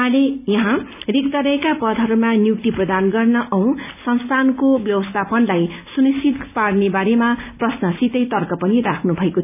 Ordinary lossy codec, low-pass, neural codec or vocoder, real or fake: none; 3.6 kHz; none; real